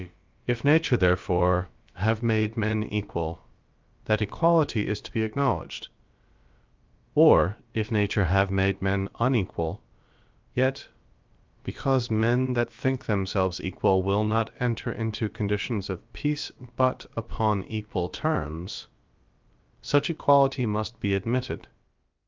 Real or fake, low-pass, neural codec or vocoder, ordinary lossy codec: fake; 7.2 kHz; codec, 16 kHz, about 1 kbps, DyCAST, with the encoder's durations; Opus, 32 kbps